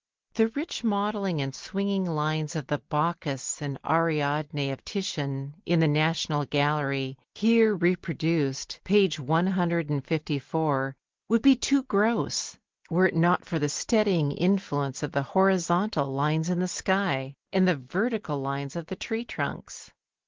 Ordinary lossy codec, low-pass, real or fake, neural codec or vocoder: Opus, 16 kbps; 7.2 kHz; real; none